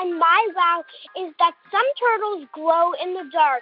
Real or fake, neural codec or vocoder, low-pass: real; none; 5.4 kHz